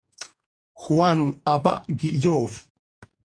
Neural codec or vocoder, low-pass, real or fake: codec, 44.1 kHz, 2.6 kbps, DAC; 9.9 kHz; fake